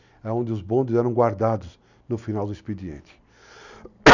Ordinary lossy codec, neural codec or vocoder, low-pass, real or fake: none; none; 7.2 kHz; real